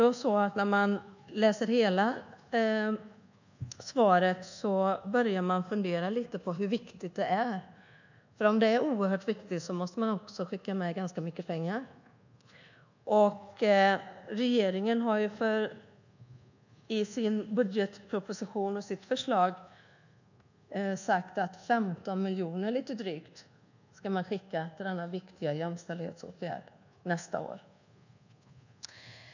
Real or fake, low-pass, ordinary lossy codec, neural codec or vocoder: fake; 7.2 kHz; none; codec, 24 kHz, 1.2 kbps, DualCodec